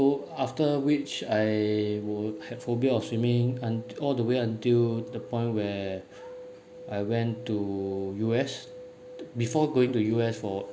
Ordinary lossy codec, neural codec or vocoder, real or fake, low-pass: none; none; real; none